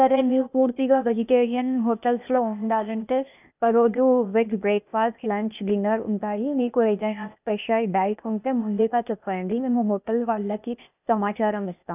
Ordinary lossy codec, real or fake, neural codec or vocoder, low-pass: none; fake; codec, 16 kHz, 0.8 kbps, ZipCodec; 3.6 kHz